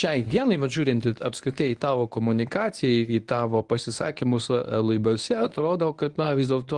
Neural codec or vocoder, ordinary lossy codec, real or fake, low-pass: codec, 24 kHz, 0.9 kbps, WavTokenizer, medium speech release version 1; Opus, 24 kbps; fake; 10.8 kHz